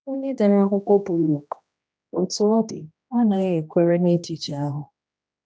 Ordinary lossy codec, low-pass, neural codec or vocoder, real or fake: none; none; codec, 16 kHz, 1 kbps, X-Codec, HuBERT features, trained on general audio; fake